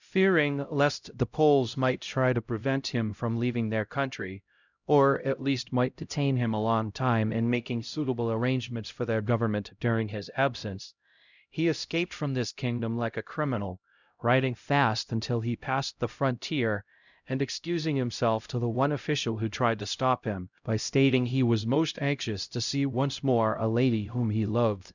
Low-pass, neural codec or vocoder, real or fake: 7.2 kHz; codec, 16 kHz, 0.5 kbps, X-Codec, HuBERT features, trained on LibriSpeech; fake